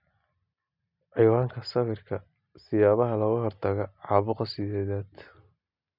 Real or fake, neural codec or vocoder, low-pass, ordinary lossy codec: real; none; 5.4 kHz; none